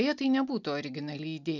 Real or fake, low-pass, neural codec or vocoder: real; 7.2 kHz; none